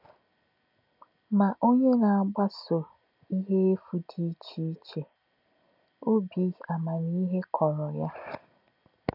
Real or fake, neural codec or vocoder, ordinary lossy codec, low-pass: real; none; none; 5.4 kHz